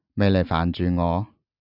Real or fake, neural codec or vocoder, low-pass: real; none; 5.4 kHz